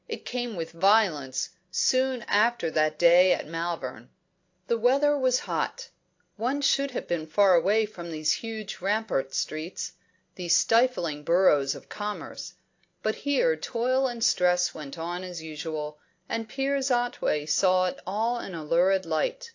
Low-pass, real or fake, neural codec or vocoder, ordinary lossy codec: 7.2 kHz; real; none; AAC, 48 kbps